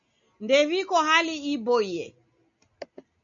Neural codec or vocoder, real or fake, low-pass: none; real; 7.2 kHz